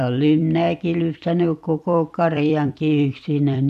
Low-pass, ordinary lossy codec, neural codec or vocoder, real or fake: 14.4 kHz; AAC, 96 kbps; vocoder, 44.1 kHz, 128 mel bands every 256 samples, BigVGAN v2; fake